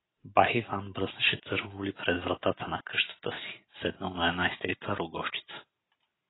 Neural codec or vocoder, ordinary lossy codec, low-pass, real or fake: none; AAC, 16 kbps; 7.2 kHz; real